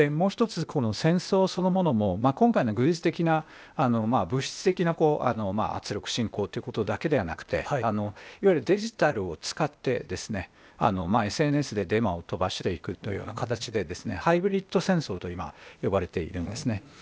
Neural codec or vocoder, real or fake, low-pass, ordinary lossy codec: codec, 16 kHz, 0.8 kbps, ZipCodec; fake; none; none